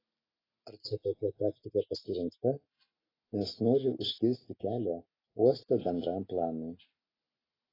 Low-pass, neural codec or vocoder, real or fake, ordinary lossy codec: 5.4 kHz; none; real; AAC, 24 kbps